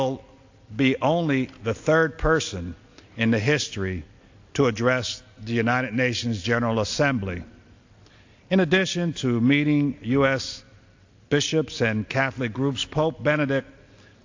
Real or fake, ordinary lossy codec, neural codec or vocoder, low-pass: real; AAC, 48 kbps; none; 7.2 kHz